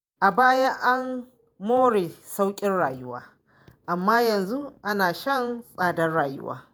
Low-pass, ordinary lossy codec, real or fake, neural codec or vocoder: none; none; fake; vocoder, 48 kHz, 128 mel bands, Vocos